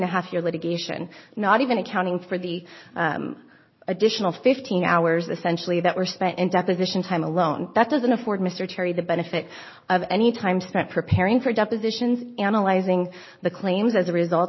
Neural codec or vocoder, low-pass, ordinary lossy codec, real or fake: none; 7.2 kHz; MP3, 24 kbps; real